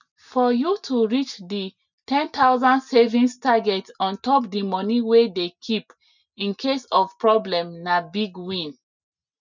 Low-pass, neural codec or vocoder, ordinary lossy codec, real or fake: 7.2 kHz; none; none; real